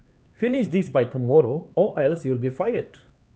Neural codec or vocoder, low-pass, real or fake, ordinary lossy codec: codec, 16 kHz, 2 kbps, X-Codec, HuBERT features, trained on LibriSpeech; none; fake; none